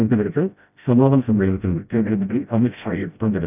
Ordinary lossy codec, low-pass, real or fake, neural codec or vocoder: none; 3.6 kHz; fake; codec, 16 kHz, 0.5 kbps, FreqCodec, smaller model